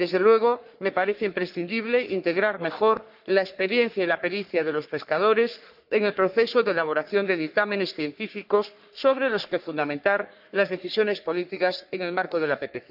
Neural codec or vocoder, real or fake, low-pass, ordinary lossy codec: codec, 44.1 kHz, 3.4 kbps, Pupu-Codec; fake; 5.4 kHz; none